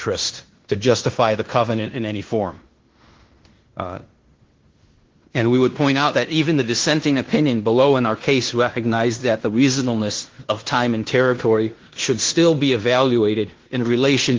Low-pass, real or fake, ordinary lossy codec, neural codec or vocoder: 7.2 kHz; fake; Opus, 16 kbps; codec, 16 kHz in and 24 kHz out, 0.9 kbps, LongCat-Audio-Codec, fine tuned four codebook decoder